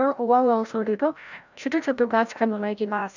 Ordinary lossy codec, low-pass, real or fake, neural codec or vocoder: none; 7.2 kHz; fake; codec, 16 kHz, 0.5 kbps, FreqCodec, larger model